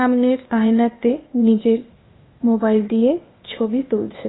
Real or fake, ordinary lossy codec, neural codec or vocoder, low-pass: fake; AAC, 16 kbps; codec, 16 kHz, 0.8 kbps, ZipCodec; 7.2 kHz